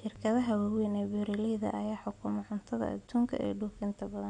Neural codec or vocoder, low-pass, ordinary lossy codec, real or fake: none; 9.9 kHz; none; real